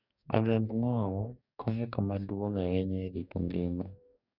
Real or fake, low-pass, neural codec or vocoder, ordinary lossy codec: fake; 5.4 kHz; codec, 44.1 kHz, 2.6 kbps, DAC; none